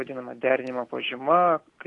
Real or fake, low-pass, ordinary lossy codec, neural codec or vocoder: real; 10.8 kHz; AAC, 48 kbps; none